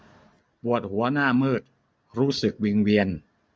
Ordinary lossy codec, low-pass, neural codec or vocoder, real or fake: none; none; none; real